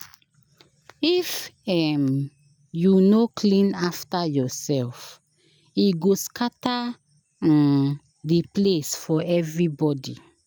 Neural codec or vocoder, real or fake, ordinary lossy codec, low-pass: none; real; none; none